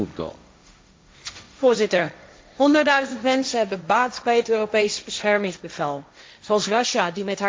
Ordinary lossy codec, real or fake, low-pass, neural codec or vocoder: none; fake; none; codec, 16 kHz, 1.1 kbps, Voila-Tokenizer